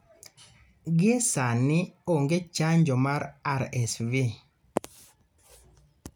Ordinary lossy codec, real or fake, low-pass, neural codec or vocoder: none; real; none; none